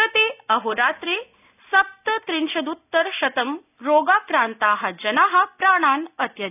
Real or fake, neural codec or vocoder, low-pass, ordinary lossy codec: real; none; 3.6 kHz; none